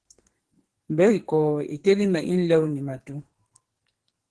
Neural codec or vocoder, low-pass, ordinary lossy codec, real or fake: codec, 44.1 kHz, 2.6 kbps, SNAC; 10.8 kHz; Opus, 16 kbps; fake